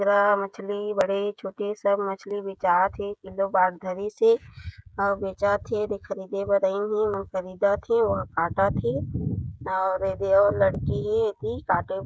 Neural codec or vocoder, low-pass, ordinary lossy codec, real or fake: codec, 16 kHz, 16 kbps, FreqCodec, smaller model; none; none; fake